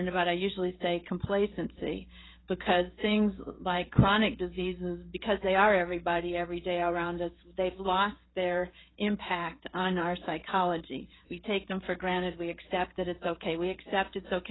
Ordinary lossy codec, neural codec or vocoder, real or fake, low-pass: AAC, 16 kbps; codec, 16 kHz, 16 kbps, FreqCodec, smaller model; fake; 7.2 kHz